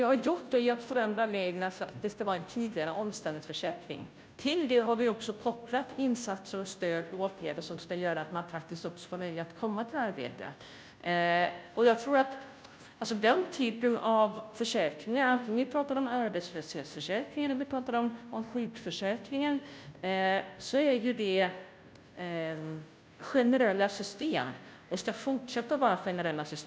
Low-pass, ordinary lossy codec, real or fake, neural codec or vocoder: none; none; fake; codec, 16 kHz, 0.5 kbps, FunCodec, trained on Chinese and English, 25 frames a second